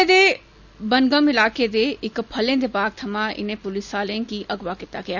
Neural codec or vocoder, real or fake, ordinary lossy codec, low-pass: none; real; none; 7.2 kHz